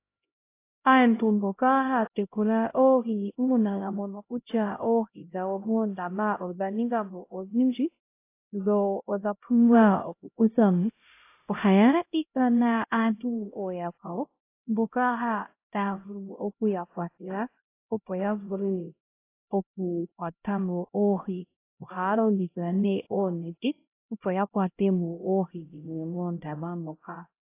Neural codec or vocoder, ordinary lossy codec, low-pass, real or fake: codec, 16 kHz, 0.5 kbps, X-Codec, HuBERT features, trained on LibriSpeech; AAC, 24 kbps; 3.6 kHz; fake